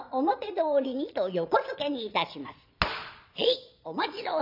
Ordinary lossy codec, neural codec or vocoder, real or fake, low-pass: none; vocoder, 22.05 kHz, 80 mel bands, Vocos; fake; 5.4 kHz